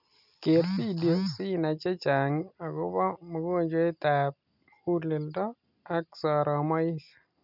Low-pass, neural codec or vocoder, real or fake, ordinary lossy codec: 5.4 kHz; none; real; none